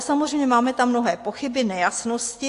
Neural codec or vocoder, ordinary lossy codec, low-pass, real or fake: none; AAC, 48 kbps; 10.8 kHz; real